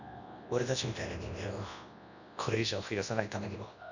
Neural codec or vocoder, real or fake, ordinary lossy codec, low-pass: codec, 24 kHz, 0.9 kbps, WavTokenizer, large speech release; fake; none; 7.2 kHz